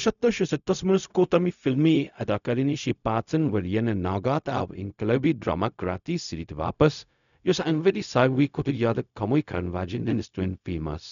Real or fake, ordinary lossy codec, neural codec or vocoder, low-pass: fake; none; codec, 16 kHz, 0.4 kbps, LongCat-Audio-Codec; 7.2 kHz